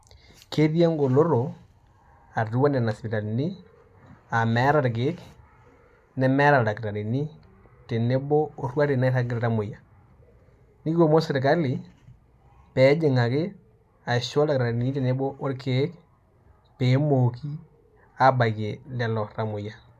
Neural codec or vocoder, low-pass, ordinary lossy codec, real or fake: none; 14.4 kHz; none; real